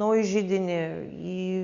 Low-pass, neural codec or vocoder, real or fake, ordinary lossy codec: 7.2 kHz; none; real; Opus, 64 kbps